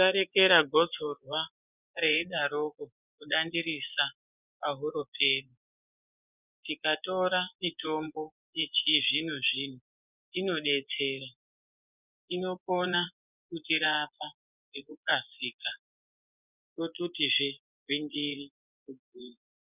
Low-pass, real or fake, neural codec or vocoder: 3.6 kHz; fake; vocoder, 24 kHz, 100 mel bands, Vocos